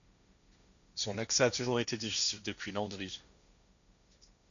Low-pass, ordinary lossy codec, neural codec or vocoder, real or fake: 7.2 kHz; AAC, 96 kbps; codec, 16 kHz, 1.1 kbps, Voila-Tokenizer; fake